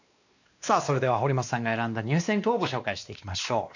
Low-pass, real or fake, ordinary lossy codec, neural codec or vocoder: 7.2 kHz; fake; none; codec, 16 kHz, 2 kbps, X-Codec, WavLM features, trained on Multilingual LibriSpeech